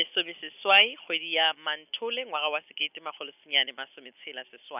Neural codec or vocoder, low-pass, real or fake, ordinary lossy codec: none; 3.6 kHz; real; none